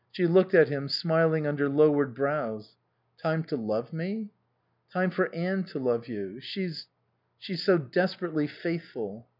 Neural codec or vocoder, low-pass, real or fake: none; 5.4 kHz; real